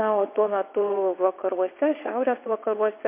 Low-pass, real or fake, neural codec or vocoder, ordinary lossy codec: 3.6 kHz; fake; vocoder, 22.05 kHz, 80 mel bands, WaveNeXt; MP3, 24 kbps